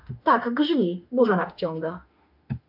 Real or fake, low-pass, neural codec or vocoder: fake; 5.4 kHz; autoencoder, 48 kHz, 32 numbers a frame, DAC-VAE, trained on Japanese speech